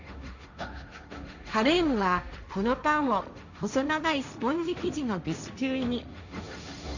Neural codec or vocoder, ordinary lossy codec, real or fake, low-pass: codec, 16 kHz, 1.1 kbps, Voila-Tokenizer; none; fake; 7.2 kHz